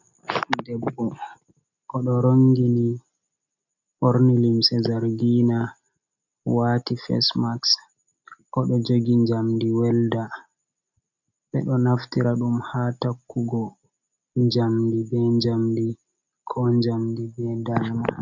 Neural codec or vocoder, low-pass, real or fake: none; 7.2 kHz; real